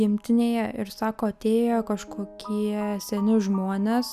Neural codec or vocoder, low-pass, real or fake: none; 14.4 kHz; real